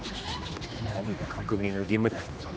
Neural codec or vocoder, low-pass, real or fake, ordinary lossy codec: codec, 16 kHz, 2 kbps, X-Codec, HuBERT features, trained on general audio; none; fake; none